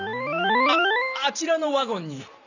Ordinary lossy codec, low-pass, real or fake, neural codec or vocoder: none; 7.2 kHz; fake; vocoder, 44.1 kHz, 128 mel bands every 512 samples, BigVGAN v2